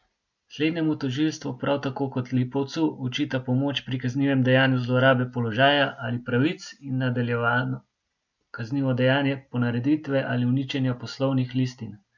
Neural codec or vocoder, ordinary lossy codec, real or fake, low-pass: none; none; real; 7.2 kHz